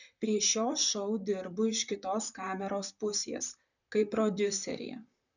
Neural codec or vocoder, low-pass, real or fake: vocoder, 44.1 kHz, 128 mel bands, Pupu-Vocoder; 7.2 kHz; fake